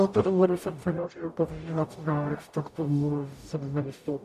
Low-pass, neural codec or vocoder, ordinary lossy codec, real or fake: 14.4 kHz; codec, 44.1 kHz, 0.9 kbps, DAC; MP3, 96 kbps; fake